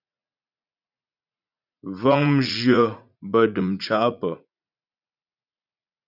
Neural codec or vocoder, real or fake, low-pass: vocoder, 44.1 kHz, 80 mel bands, Vocos; fake; 5.4 kHz